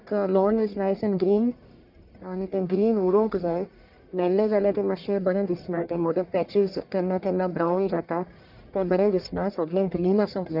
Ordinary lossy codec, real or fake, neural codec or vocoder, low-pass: none; fake; codec, 44.1 kHz, 1.7 kbps, Pupu-Codec; 5.4 kHz